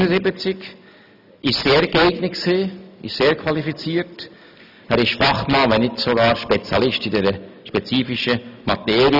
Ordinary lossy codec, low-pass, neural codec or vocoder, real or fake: none; 5.4 kHz; none; real